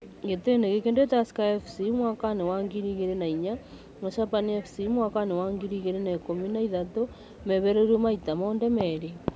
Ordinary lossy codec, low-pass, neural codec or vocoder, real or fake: none; none; none; real